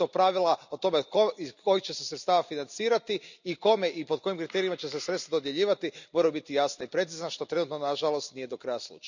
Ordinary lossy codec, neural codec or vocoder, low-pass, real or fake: none; none; 7.2 kHz; real